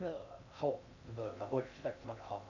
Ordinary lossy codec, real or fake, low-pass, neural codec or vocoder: none; fake; 7.2 kHz; codec, 16 kHz in and 24 kHz out, 0.6 kbps, FocalCodec, streaming, 2048 codes